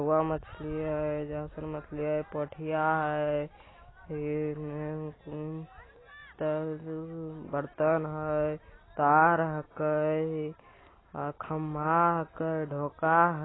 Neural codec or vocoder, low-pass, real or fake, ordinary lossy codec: none; 7.2 kHz; real; AAC, 16 kbps